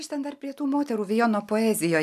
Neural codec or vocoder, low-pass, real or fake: none; 14.4 kHz; real